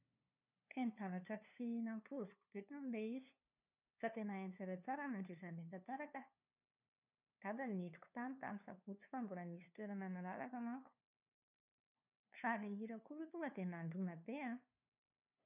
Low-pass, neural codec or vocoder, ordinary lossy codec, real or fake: 3.6 kHz; codec, 16 kHz, 2 kbps, FunCodec, trained on LibriTTS, 25 frames a second; none; fake